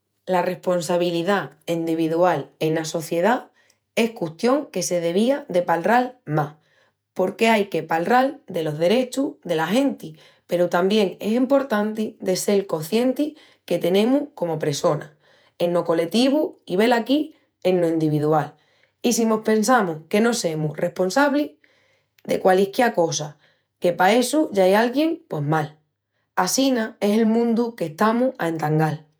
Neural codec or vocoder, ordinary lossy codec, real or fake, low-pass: vocoder, 48 kHz, 128 mel bands, Vocos; none; fake; none